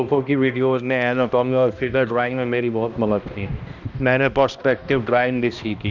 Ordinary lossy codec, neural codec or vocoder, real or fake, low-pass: none; codec, 16 kHz, 1 kbps, X-Codec, HuBERT features, trained on balanced general audio; fake; 7.2 kHz